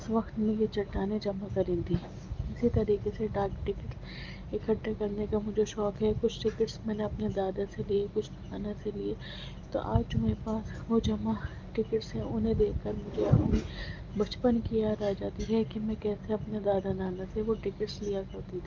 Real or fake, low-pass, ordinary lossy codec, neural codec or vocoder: real; 7.2 kHz; Opus, 24 kbps; none